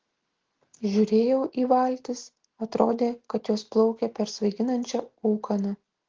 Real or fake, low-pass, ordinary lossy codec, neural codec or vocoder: real; 7.2 kHz; Opus, 16 kbps; none